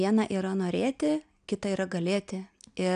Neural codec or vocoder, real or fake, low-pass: none; real; 9.9 kHz